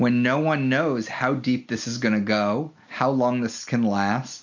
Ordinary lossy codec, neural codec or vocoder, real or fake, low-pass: MP3, 48 kbps; none; real; 7.2 kHz